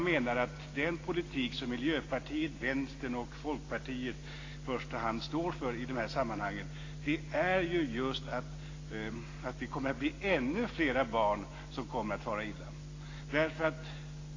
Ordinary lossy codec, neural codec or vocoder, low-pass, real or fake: AAC, 32 kbps; none; 7.2 kHz; real